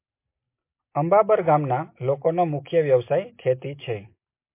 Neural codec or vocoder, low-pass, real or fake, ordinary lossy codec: none; 3.6 kHz; real; MP3, 24 kbps